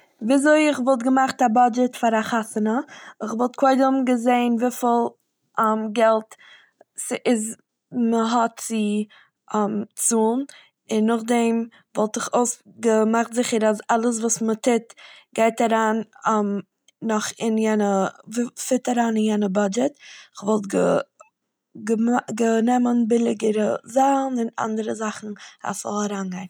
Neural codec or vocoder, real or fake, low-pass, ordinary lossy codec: none; real; none; none